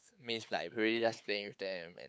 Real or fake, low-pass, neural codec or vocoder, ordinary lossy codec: fake; none; codec, 16 kHz, 4 kbps, X-Codec, WavLM features, trained on Multilingual LibriSpeech; none